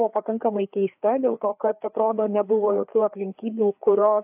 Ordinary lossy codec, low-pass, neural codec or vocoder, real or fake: AAC, 32 kbps; 3.6 kHz; codec, 16 kHz, 4 kbps, FreqCodec, larger model; fake